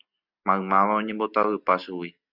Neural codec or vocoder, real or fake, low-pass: none; real; 5.4 kHz